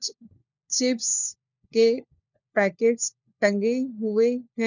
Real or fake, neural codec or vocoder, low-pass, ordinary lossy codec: fake; codec, 16 kHz, 4 kbps, FunCodec, trained on LibriTTS, 50 frames a second; 7.2 kHz; none